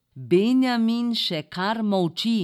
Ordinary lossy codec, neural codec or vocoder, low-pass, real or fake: none; none; 19.8 kHz; real